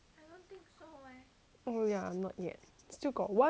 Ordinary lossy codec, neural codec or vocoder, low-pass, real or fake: none; none; none; real